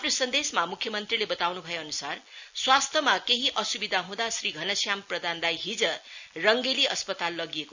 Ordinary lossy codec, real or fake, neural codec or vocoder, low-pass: none; real; none; 7.2 kHz